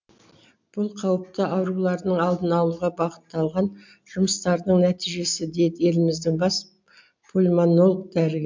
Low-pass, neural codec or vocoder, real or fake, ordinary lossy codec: 7.2 kHz; none; real; MP3, 64 kbps